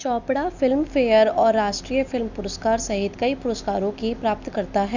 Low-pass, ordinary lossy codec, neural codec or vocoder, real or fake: 7.2 kHz; none; none; real